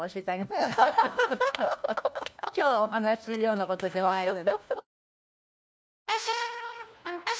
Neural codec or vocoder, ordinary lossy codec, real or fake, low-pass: codec, 16 kHz, 1 kbps, FunCodec, trained on LibriTTS, 50 frames a second; none; fake; none